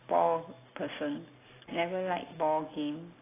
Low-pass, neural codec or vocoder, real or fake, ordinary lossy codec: 3.6 kHz; none; real; AAC, 16 kbps